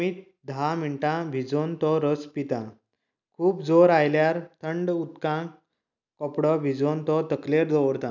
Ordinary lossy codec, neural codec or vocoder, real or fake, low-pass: none; none; real; 7.2 kHz